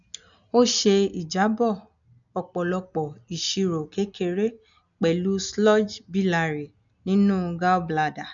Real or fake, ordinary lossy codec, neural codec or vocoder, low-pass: real; none; none; 7.2 kHz